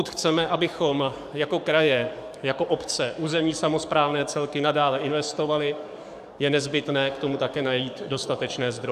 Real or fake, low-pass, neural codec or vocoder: fake; 14.4 kHz; codec, 44.1 kHz, 7.8 kbps, DAC